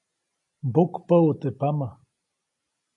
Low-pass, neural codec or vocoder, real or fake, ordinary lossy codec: 10.8 kHz; none; real; AAC, 64 kbps